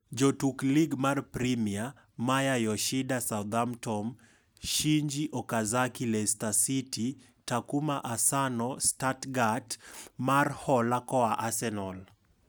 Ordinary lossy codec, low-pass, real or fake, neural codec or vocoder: none; none; real; none